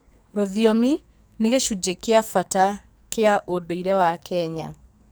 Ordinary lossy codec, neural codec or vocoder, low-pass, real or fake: none; codec, 44.1 kHz, 2.6 kbps, SNAC; none; fake